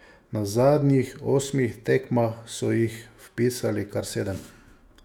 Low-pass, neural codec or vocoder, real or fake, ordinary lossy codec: 19.8 kHz; autoencoder, 48 kHz, 128 numbers a frame, DAC-VAE, trained on Japanese speech; fake; none